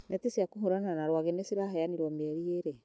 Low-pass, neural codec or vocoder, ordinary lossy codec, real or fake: none; none; none; real